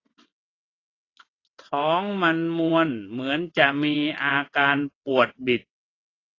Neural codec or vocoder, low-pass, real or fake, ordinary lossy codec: vocoder, 22.05 kHz, 80 mel bands, WaveNeXt; 7.2 kHz; fake; AAC, 32 kbps